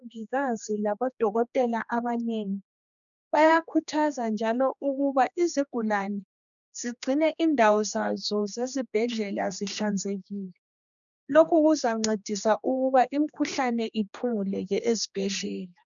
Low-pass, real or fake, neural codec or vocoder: 7.2 kHz; fake; codec, 16 kHz, 2 kbps, X-Codec, HuBERT features, trained on general audio